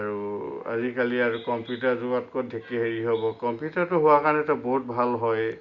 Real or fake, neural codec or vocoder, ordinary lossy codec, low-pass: real; none; MP3, 64 kbps; 7.2 kHz